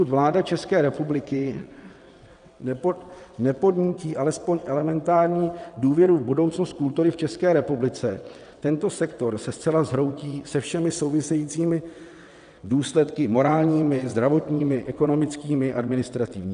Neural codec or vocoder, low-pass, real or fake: vocoder, 22.05 kHz, 80 mel bands, WaveNeXt; 9.9 kHz; fake